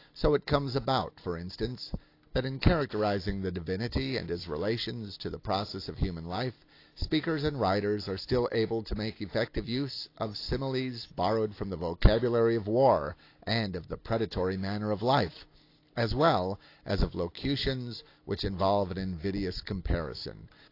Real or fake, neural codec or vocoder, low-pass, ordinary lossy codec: real; none; 5.4 kHz; AAC, 32 kbps